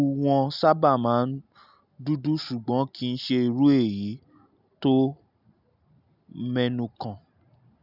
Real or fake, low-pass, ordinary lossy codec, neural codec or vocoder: real; 5.4 kHz; none; none